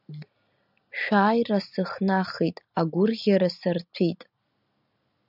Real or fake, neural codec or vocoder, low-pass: real; none; 5.4 kHz